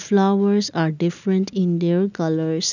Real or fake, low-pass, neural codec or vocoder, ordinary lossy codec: real; 7.2 kHz; none; none